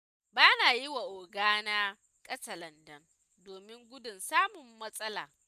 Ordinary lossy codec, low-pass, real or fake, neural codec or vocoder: none; 14.4 kHz; real; none